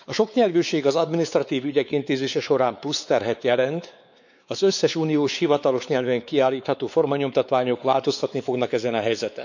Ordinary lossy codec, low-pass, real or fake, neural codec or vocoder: none; 7.2 kHz; fake; codec, 16 kHz, 4 kbps, X-Codec, WavLM features, trained on Multilingual LibriSpeech